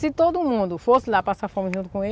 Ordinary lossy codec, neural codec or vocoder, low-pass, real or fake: none; none; none; real